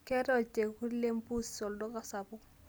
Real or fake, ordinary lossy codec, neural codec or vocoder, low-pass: real; none; none; none